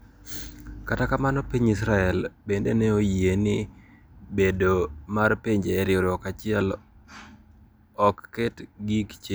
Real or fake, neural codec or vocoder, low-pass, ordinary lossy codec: real; none; none; none